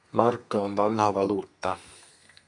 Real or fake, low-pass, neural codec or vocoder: fake; 10.8 kHz; codec, 32 kHz, 1.9 kbps, SNAC